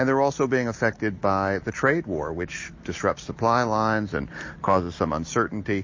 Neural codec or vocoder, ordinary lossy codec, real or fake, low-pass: none; MP3, 32 kbps; real; 7.2 kHz